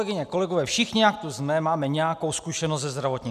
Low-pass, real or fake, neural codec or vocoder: 14.4 kHz; real; none